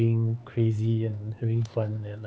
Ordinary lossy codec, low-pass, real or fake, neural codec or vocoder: none; none; fake; codec, 16 kHz, 4 kbps, X-Codec, HuBERT features, trained on LibriSpeech